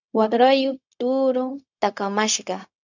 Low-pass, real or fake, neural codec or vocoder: 7.2 kHz; fake; codec, 16 kHz, 0.4 kbps, LongCat-Audio-Codec